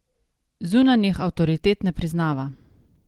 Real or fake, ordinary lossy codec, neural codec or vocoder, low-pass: real; Opus, 16 kbps; none; 19.8 kHz